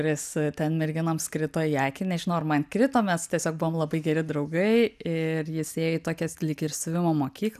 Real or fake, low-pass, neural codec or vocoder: real; 14.4 kHz; none